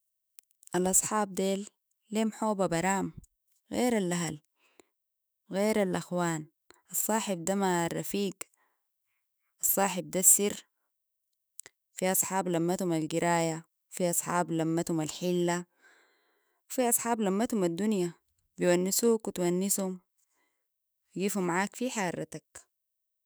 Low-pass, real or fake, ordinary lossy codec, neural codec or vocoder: none; fake; none; autoencoder, 48 kHz, 128 numbers a frame, DAC-VAE, trained on Japanese speech